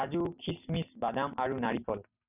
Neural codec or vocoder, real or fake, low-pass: none; real; 3.6 kHz